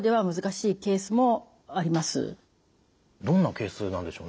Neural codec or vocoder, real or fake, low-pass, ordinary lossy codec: none; real; none; none